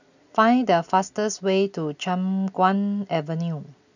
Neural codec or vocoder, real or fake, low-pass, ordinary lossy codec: none; real; 7.2 kHz; none